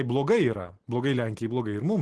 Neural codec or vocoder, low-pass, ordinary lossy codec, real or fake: none; 10.8 kHz; Opus, 16 kbps; real